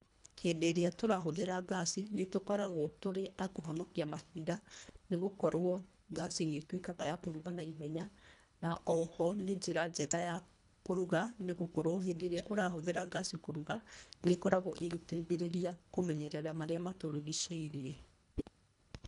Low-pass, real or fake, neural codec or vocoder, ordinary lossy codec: 10.8 kHz; fake; codec, 24 kHz, 1.5 kbps, HILCodec; none